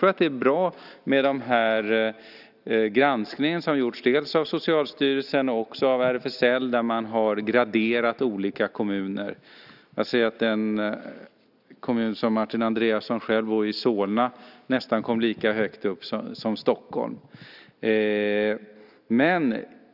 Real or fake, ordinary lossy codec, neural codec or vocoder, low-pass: real; none; none; 5.4 kHz